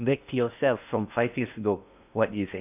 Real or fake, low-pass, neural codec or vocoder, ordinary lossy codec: fake; 3.6 kHz; codec, 16 kHz in and 24 kHz out, 0.6 kbps, FocalCodec, streaming, 2048 codes; none